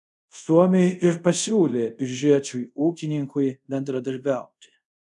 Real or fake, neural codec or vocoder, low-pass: fake; codec, 24 kHz, 0.5 kbps, DualCodec; 10.8 kHz